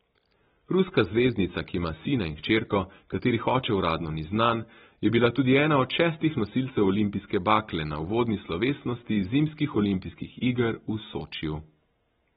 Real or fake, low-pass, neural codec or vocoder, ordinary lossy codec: real; 10.8 kHz; none; AAC, 16 kbps